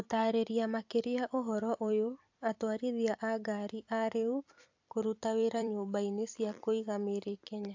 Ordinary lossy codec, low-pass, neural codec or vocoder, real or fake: AAC, 48 kbps; 7.2 kHz; vocoder, 44.1 kHz, 128 mel bands every 256 samples, BigVGAN v2; fake